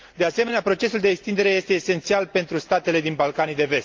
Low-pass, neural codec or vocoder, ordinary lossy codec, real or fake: 7.2 kHz; none; Opus, 24 kbps; real